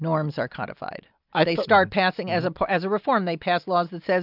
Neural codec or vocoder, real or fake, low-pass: none; real; 5.4 kHz